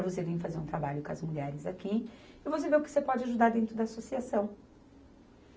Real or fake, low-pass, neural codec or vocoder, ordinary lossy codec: real; none; none; none